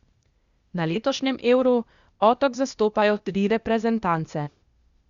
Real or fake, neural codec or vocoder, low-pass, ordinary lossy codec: fake; codec, 16 kHz, 0.8 kbps, ZipCodec; 7.2 kHz; Opus, 64 kbps